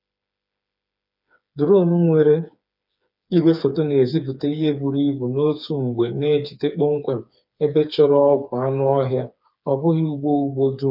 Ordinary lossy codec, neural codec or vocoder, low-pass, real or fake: none; codec, 16 kHz, 4 kbps, FreqCodec, smaller model; 5.4 kHz; fake